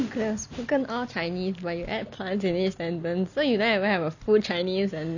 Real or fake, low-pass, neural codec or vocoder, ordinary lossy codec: real; 7.2 kHz; none; none